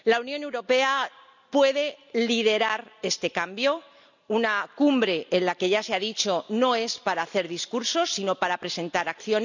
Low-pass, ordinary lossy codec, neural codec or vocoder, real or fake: 7.2 kHz; none; none; real